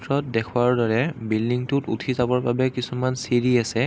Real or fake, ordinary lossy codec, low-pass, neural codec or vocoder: real; none; none; none